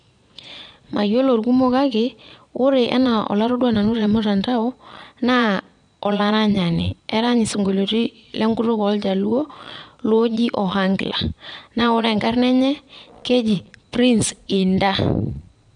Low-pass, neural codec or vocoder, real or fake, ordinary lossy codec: 9.9 kHz; vocoder, 22.05 kHz, 80 mel bands, Vocos; fake; none